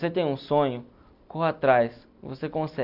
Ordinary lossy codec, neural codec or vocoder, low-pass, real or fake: none; none; 5.4 kHz; real